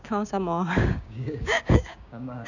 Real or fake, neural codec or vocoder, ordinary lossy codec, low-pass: fake; codec, 16 kHz, 6 kbps, DAC; none; 7.2 kHz